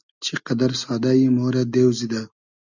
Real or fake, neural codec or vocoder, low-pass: real; none; 7.2 kHz